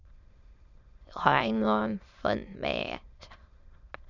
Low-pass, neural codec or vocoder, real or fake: 7.2 kHz; autoencoder, 22.05 kHz, a latent of 192 numbers a frame, VITS, trained on many speakers; fake